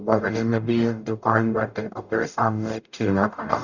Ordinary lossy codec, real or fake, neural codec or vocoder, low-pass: none; fake; codec, 44.1 kHz, 0.9 kbps, DAC; 7.2 kHz